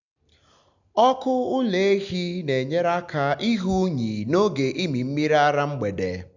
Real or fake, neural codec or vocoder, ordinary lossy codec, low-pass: real; none; none; 7.2 kHz